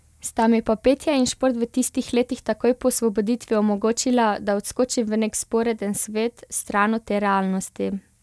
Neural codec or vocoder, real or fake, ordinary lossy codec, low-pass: none; real; none; none